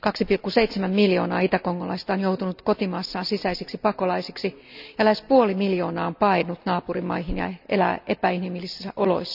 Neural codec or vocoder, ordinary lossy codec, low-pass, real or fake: none; none; 5.4 kHz; real